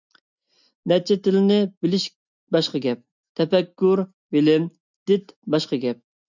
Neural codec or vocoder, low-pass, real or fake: none; 7.2 kHz; real